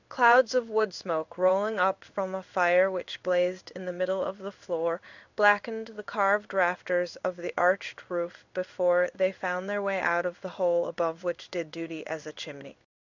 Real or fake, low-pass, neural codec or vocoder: fake; 7.2 kHz; codec, 16 kHz in and 24 kHz out, 1 kbps, XY-Tokenizer